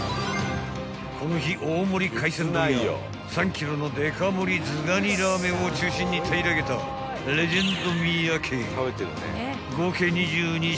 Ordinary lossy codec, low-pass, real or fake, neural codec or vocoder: none; none; real; none